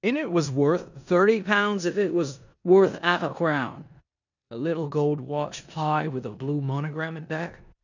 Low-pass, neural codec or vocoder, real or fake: 7.2 kHz; codec, 16 kHz in and 24 kHz out, 0.9 kbps, LongCat-Audio-Codec, four codebook decoder; fake